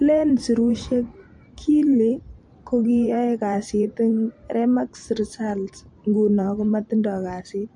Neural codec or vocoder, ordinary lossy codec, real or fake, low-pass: vocoder, 44.1 kHz, 128 mel bands every 512 samples, BigVGAN v2; MP3, 48 kbps; fake; 10.8 kHz